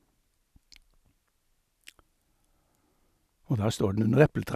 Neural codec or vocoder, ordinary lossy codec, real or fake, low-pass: none; none; real; 14.4 kHz